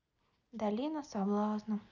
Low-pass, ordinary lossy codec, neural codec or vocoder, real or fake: 7.2 kHz; none; none; real